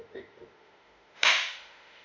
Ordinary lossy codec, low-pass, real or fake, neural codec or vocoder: MP3, 64 kbps; 7.2 kHz; fake; autoencoder, 48 kHz, 32 numbers a frame, DAC-VAE, trained on Japanese speech